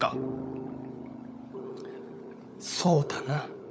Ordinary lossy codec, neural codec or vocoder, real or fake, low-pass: none; codec, 16 kHz, 16 kbps, FunCodec, trained on LibriTTS, 50 frames a second; fake; none